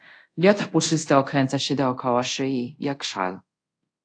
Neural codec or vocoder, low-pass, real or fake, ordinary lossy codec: codec, 24 kHz, 0.5 kbps, DualCodec; 9.9 kHz; fake; AAC, 48 kbps